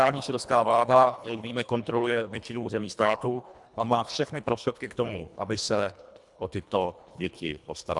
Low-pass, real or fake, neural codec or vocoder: 10.8 kHz; fake; codec, 24 kHz, 1.5 kbps, HILCodec